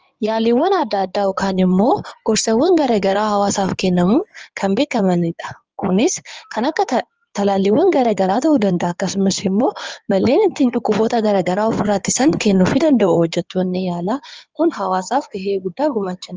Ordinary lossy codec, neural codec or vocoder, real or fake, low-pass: Opus, 24 kbps; codec, 16 kHz in and 24 kHz out, 2.2 kbps, FireRedTTS-2 codec; fake; 7.2 kHz